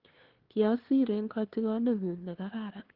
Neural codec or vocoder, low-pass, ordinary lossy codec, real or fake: codec, 16 kHz, 2 kbps, FunCodec, trained on LibriTTS, 25 frames a second; 5.4 kHz; Opus, 16 kbps; fake